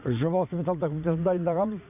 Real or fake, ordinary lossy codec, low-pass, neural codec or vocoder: fake; none; 3.6 kHz; vocoder, 44.1 kHz, 80 mel bands, Vocos